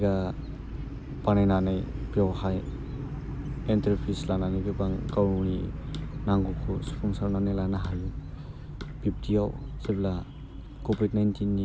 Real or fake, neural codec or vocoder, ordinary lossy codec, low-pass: real; none; none; none